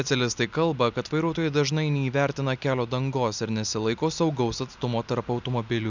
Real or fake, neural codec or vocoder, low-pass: real; none; 7.2 kHz